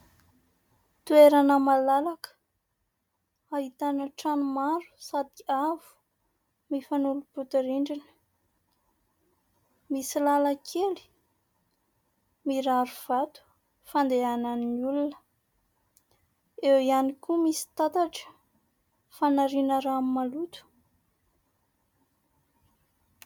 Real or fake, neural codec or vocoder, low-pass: real; none; 19.8 kHz